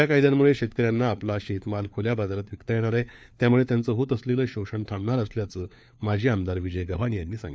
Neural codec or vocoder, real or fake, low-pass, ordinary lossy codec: codec, 16 kHz, 4 kbps, FunCodec, trained on LibriTTS, 50 frames a second; fake; none; none